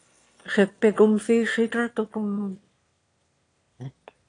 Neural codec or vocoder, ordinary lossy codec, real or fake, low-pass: autoencoder, 22.05 kHz, a latent of 192 numbers a frame, VITS, trained on one speaker; AAC, 48 kbps; fake; 9.9 kHz